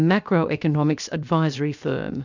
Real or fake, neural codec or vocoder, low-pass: fake; codec, 16 kHz, 0.7 kbps, FocalCodec; 7.2 kHz